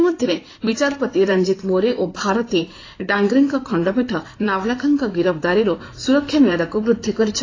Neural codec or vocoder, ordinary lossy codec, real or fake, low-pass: codec, 16 kHz in and 24 kHz out, 2.2 kbps, FireRedTTS-2 codec; AAC, 32 kbps; fake; 7.2 kHz